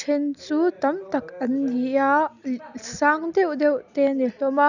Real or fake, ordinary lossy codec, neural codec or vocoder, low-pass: real; none; none; 7.2 kHz